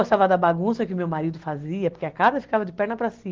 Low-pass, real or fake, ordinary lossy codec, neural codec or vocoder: 7.2 kHz; real; Opus, 16 kbps; none